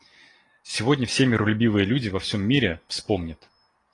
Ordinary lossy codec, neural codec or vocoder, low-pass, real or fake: AAC, 32 kbps; none; 10.8 kHz; real